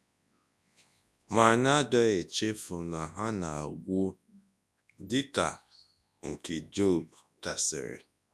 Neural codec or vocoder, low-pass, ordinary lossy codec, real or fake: codec, 24 kHz, 0.9 kbps, WavTokenizer, large speech release; none; none; fake